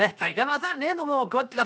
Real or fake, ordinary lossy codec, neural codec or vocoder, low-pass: fake; none; codec, 16 kHz, 0.7 kbps, FocalCodec; none